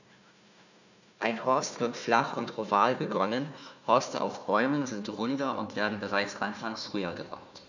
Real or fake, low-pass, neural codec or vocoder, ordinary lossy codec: fake; 7.2 kHz; codec, 16 kHz, 1 kbps, FunCodec, trained on Chinese and English, 50 frames a second; none